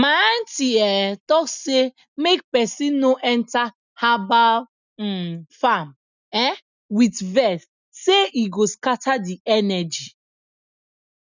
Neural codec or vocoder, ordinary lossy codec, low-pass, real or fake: none; none; 7.2 kHz; real